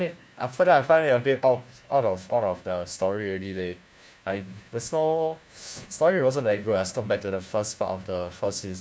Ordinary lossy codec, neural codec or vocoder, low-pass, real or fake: none; codec, 16 kHz, 1 kbps, FunCodec, trained on LibriTTS, 50 frames a second; none; fake